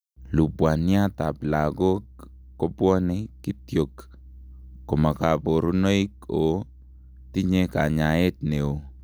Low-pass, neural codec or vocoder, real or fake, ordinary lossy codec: none; none; real; none